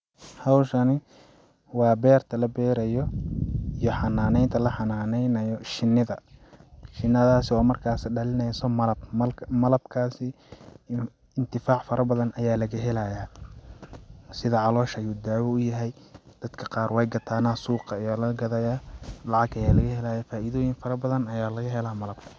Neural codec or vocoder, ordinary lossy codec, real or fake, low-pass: none; none; real; none